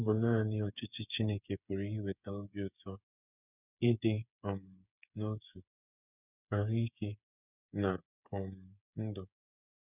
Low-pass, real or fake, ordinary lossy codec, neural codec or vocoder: 3.6 kHz; fake; none; codec, 16 kHz, 8 kbps, FreqCodec, smaller model